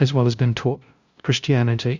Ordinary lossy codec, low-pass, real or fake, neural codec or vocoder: Opus, 64 kbps; 7.2 kHz; fake; codec, 16 kHz, 0.5 kbps, FunCodec, trained on LibriTTS, 25 frames a second